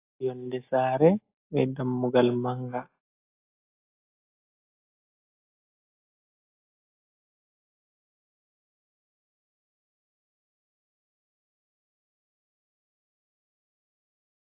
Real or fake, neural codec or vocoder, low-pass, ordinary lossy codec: real; none; 3.6 kHz; AAC, 24 kbps